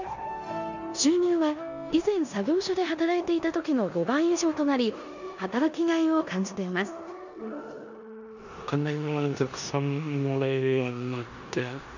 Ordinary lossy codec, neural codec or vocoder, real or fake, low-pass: none; codec, 16 kHz in and 24 kHz out, 0.9 kbps, LongCat-Audio-Codec, four codebook decoder; fake; 7.2 kHz